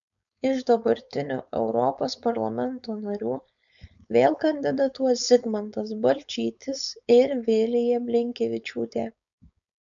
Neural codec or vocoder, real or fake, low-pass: codec, 16 kHz, 4.8 kbps, FACodec; fake; 7.2 kHz